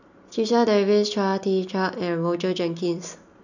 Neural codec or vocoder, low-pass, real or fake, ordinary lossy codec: none; 7.2 kHz; real; none